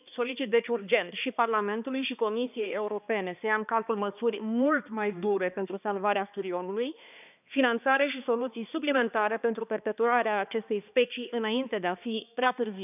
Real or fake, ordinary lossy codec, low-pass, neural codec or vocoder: fake; none; 3.6 kHz; codec, 16 kHz, 2 kbps, X-Codec, HuBERT features, trained on balanced general audio